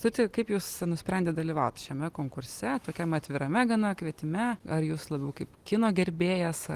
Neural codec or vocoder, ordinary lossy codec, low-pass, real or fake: none; Opus, 24 kbps; 14.4 kHz; real